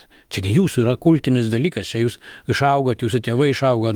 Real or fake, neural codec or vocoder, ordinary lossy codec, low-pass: fake; autoencoder, 48 kHz, 32 numbers a frame, DAC-VAE, trained on Japanese speech; Opus, 32 kbps; 19.8 kHz